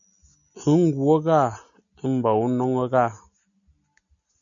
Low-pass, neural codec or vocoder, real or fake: 7.2 kHz; none; real